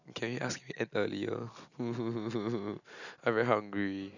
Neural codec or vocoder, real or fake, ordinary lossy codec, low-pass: none; real; none; 7.2 kHz